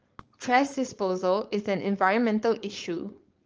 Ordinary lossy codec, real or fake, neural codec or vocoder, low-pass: Opus, 24 kbps; fake; codec, 16 kHz, 4.8 kbps, FACodec; 7.2 kHz